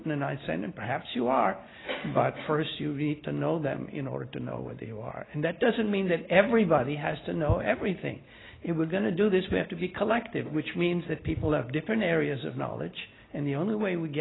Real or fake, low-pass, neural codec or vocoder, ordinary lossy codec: real; 7.2 kHz; none; AAC, 16 kbps